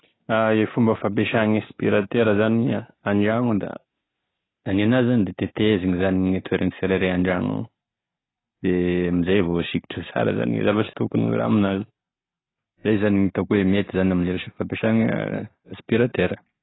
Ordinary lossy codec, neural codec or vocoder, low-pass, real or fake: AAC, 16 kbps; none; 7.2 kHz; real